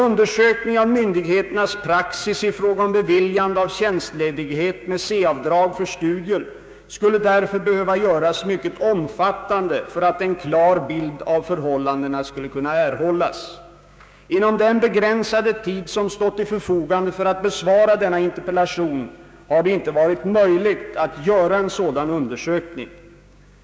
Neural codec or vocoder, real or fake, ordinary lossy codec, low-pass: codec, 16 kHz, 6 kbps, DAC; fake; none; none